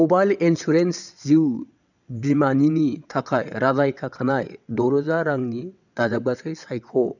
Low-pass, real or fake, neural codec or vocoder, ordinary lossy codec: 7.2 kHz; fake; vocoder, 22.05 kHz, 80 mel bands, WaveNeXt; none